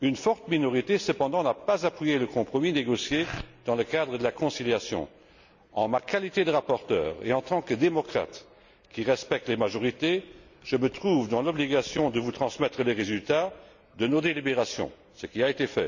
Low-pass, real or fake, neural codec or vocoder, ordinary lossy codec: 7.2 kHz; real; none; none